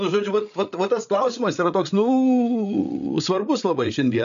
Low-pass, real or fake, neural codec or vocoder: 7.2 kHz; fake; codec, 16 kHz, 8 kbps, FreqCodec, larger model